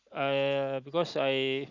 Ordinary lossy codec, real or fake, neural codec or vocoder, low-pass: Opus, 64 kbps; real; none; 7.2 kHz